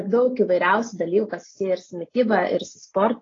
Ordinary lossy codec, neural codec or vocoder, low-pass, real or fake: AAC, 32 kbps; none; 7.2 kHz; real